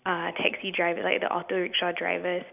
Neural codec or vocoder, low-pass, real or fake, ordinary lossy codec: none; 3.6 kHz; real; none